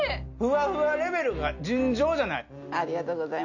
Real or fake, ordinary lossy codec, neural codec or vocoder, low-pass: real; none; none; 7.2 kHz